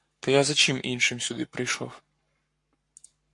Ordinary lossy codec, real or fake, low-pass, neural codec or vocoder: MP3, 48 kbps; fake; 10.8 kHz; codec, 44.1 kHz, 7.8 kbps, Pupu-Codec